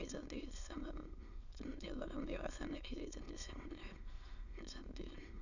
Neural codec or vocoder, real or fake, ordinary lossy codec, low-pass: autoencoder, 22.05 kHz, a latent of 192 numbers a frame, VITS, trained on many speakers; fake; none; 7.2 kHz